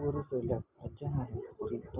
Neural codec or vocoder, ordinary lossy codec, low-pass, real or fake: none; Opus, 64 kbps; 3.6 kHz; real